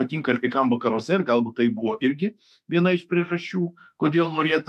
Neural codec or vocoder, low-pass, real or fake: autoencoder, 48 kHz, 32 numbers a frame, DAC-VAE, trained on Japanese speech; 14.4 kHz; fake